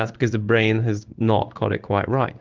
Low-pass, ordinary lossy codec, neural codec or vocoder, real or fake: 7.2 kHz; Opus, 32 kbps; codec, 16 kHz in and 24 kHz out, 1 kbps, XY-Tokenizer; fake